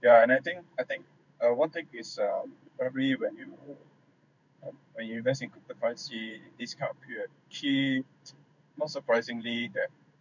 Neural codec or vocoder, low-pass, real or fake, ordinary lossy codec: codec, 16 kHz in and 24 kHz out, 1 kbps, XY-Tokenizer; 7.2 kHz; fake; none